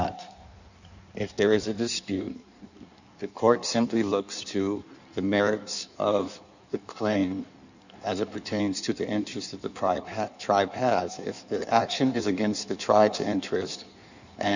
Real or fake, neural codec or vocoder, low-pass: fake; codec, 16 kHz in and 24 kHz out, 1.1 kbps, FireRedTTS-2 codec; 7.2 kHz